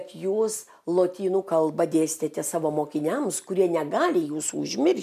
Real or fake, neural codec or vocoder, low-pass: real; none; 14.4 kHz